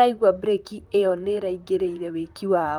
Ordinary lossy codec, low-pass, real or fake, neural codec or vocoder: Opus, 32 kbps; 19.8 kHz; fake; vocoder, 44.1 kHz, 128 mel bands, Pupu-Vocoder